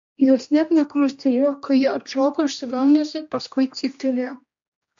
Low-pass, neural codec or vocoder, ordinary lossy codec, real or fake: 7.2 kHz; codec, 16 kHz, 1 kbps, X-Codec, HuBERT features, trained on general audio; MP3, 48 kbps; fake